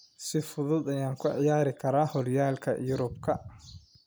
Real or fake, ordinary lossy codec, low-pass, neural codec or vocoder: real; none; none; none